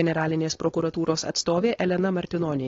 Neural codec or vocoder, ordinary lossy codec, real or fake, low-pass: codec, 16 kHz, 4.8 kbps, FACodec; AAC, 32 kbps; fake; 7.2 kHz